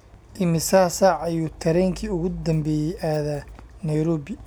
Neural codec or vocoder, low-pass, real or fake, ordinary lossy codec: none; none; real; none